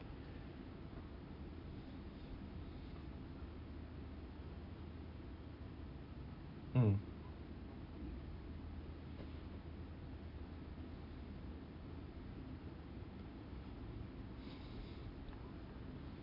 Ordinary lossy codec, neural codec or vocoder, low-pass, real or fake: AAC, 48 kbps; none; 5.4 kHz; real